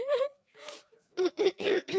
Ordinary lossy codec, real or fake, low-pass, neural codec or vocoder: none; fake; none; codec, 16 kHz, 4 kbps, FreqCodec, larger model